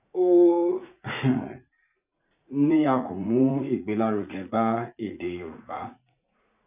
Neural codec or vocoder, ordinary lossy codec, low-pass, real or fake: vocoder, 44.1 kHz, 80 mel bands, Vocos; none; 3.6 kHz; fake